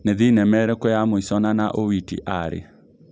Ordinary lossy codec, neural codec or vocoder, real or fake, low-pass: none; none; real; none